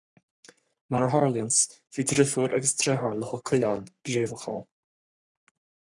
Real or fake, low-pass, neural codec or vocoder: fake; 10.8 kHz; codec, 44.1 kHz, 3.4 kbps, Pupu-Codec